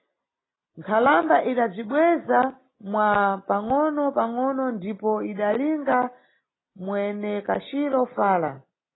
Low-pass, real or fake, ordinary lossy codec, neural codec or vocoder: 7.2 kHz; real; AAC, 16 kbps; none